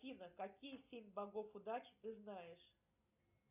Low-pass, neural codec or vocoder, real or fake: 3.6 kHz; none; real